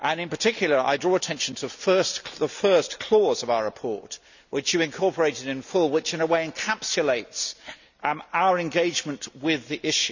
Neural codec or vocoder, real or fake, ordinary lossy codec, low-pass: none; real; none; 7.2 kHz